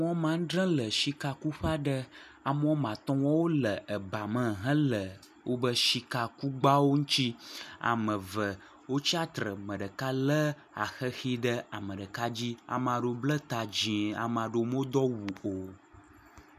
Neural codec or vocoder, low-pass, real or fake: none; 14.4 kHz; real